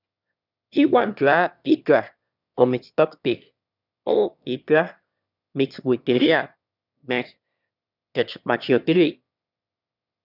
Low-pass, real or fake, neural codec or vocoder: 5.4 kHz; fake; autoencoder, 22.05 kHz, a latent of 192 numbers a frame, VITS, trained on one speaker